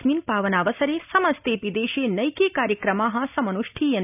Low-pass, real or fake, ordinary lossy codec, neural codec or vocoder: 3.6 kHz; real; none; none